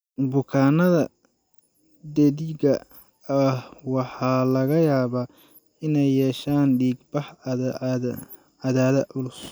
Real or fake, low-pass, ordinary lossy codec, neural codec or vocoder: real; none; none; none